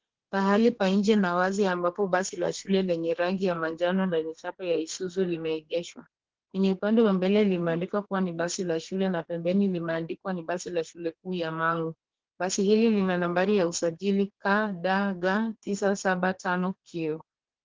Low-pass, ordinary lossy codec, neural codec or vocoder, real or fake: 7.2 kHz; Opus, 16 kbps; codec, 32 kHz, 1.9 kbps, SNAC; fake